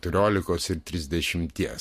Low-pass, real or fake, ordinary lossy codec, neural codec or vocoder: 14.4 kHz; real; MP3, 64 kbps; none